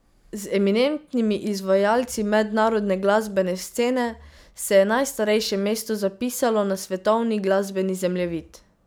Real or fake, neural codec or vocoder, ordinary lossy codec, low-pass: real; none; none; none